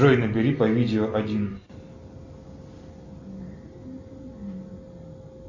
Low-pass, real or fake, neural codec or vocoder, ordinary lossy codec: 7.2 kHz; real; none; AAC, 48 kbps